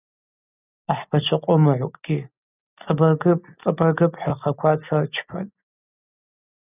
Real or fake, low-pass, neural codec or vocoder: real; 3.6 kHz; none